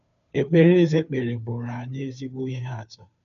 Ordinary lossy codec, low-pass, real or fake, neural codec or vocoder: none; 7.2 kHz; fake; codec, 16 kHz, 2 kbps, FunCodec, trained on Chinese and English, 25 frames a second